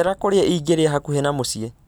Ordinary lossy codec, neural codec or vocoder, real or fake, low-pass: none; none; real; none